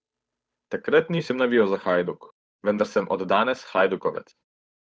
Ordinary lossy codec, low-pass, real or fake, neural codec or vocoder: none; none; fake; codec, 16 kHz, 8 kbps, FunCodec, trained on Chinese and English, 25 frames a second